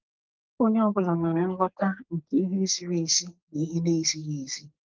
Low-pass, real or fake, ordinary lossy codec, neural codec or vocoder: 7.2 kHz; fake; Opus, 24 kbps; codec, 44.1 kHz, 2.6 kbps, SNAC